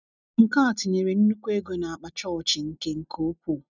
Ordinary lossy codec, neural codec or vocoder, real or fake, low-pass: none; none; real; 7.2 kHz